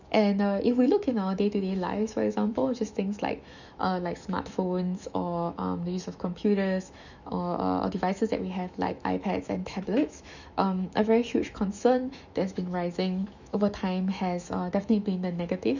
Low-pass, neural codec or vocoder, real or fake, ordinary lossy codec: 7.2 kHz; autoencoder, 48 kHz, 128 numbers a frame, DAC-VAE, trained on Japanese speech; fake; none